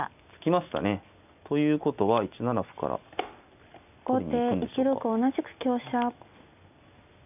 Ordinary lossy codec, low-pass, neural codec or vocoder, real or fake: none; 3.6 kHz; none; real